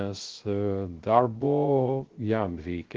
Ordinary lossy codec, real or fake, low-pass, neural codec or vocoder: Opus, 16 kbps; fake; 7.2 kHz; codec, 16 kHz, 0.3 kbps, FocalCodec